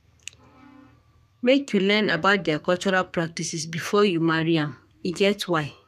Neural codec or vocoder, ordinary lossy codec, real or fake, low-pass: codec, 32 kHz, 1.9 kbps, SNAC; none; fake; 14.4 kHz